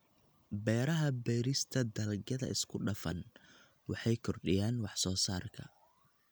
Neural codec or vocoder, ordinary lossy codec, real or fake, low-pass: none; none; real; none